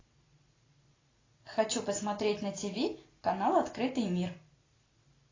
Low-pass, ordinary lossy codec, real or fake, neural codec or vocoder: 7.2 kHz; AAC, 32 kbps; real; none